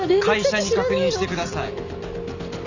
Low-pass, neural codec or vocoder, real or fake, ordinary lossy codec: 7.2 kHz; none; real; none